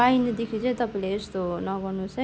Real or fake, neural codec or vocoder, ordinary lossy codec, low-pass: real; none; none; none